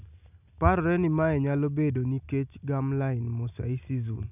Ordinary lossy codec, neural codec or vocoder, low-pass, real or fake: none; none; 3.6 kHz; real